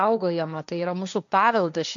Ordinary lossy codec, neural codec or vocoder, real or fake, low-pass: MP3, 96 kbps; codec, 16 kHz, 1.1 kbps, Voila-Tokenizer; fake; 7.2 kHz